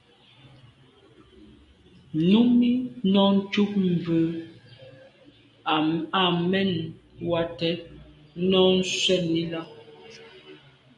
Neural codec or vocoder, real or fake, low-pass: none; real; 10.8 kHz